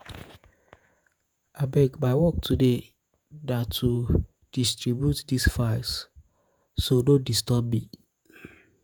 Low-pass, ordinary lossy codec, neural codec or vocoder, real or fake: none; none; vocoder, 48 kHz, 128 mel bands, Vocos; fake